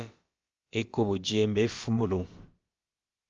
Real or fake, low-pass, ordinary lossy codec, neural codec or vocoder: fake; 7.2 kHz; Opus, 32 kbps; codec, 16 kHz, about 1 kbps, DyCAST, with the encoder's durations